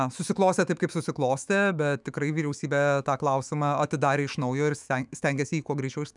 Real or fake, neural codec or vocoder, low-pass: fake; autoencoder, 48 kHz, 128 numbers a frame, DAC-VAE, trained on Japanese speech; 10.8 kHz